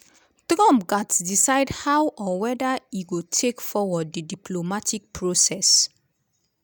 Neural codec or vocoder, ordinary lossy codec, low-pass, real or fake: none; none; none; real